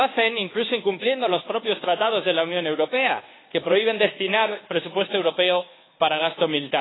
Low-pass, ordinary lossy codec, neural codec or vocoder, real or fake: 7.2 kHz; AAC, 16 kbps; codec, 24 kHz, 1.2 kbps, DualCodec; fake